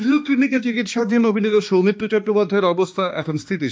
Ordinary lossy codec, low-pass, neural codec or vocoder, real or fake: none; none; codec, 16 kHz, 2 kbps, X-Codec, HuBERT features, trained on balanced general audio; fake